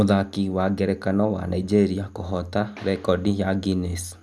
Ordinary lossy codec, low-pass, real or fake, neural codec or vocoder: none; none; real; none